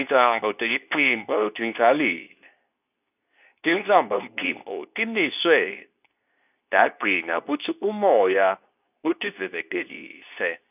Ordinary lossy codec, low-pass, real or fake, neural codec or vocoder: none; 3.6 kHz; fake; codec, 24 kHz, 0.9 kbps, WavTokenizer, medium speech release version 2